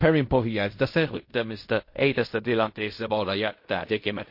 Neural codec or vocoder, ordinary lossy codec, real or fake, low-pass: codec, 16 kHz in and 24 kHz out, 0.4 kbps, LongCat-Audio-Codec, fine tuned four codebook decoder; MP3, 32 kbps; fake; 5.4 kHz